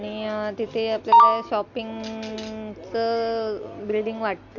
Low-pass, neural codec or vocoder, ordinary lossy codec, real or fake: 7.2 kHz; none; none; real